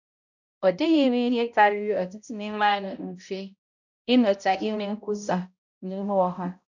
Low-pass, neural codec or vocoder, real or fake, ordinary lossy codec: 7.2 kHz; codec, 16 kHz, 0.5 kbps, X-Codec, HuBERT features, trained on balanced general audio; fake; none